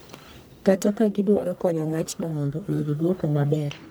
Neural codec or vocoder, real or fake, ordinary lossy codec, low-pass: codec, 44.1 kHz, 1.7 kbps, Pupu-Codec; fake; none; none